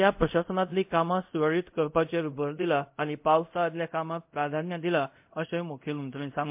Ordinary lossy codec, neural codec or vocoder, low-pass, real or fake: MP3, 32 kbps; codec, 24 kHz, 0.5 kbps, DualCodec; 3.6 kHz; fake